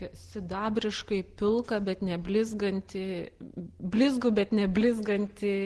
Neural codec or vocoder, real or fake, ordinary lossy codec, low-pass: none; real; Opus, 16 kbps; 10.8 kHz